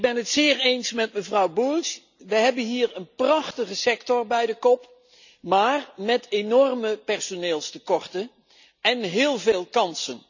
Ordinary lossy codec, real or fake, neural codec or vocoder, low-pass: none; real; none; 7.2 kHz